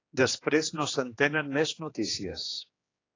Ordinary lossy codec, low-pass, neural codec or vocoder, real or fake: AAC, 32 kbps; 7.2 kHz; codec, 16 kHz, 2 kbps, X-Codec, HuBERT features, trained on general audio; fake